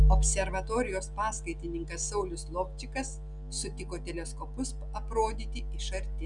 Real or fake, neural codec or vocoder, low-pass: real; none; 10.8 kHz